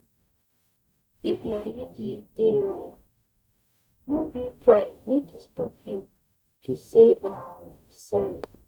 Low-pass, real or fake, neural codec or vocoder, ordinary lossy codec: 19.8 kHz; fake; codec, 44.1 kHz, 0.9 kbps, DAC; none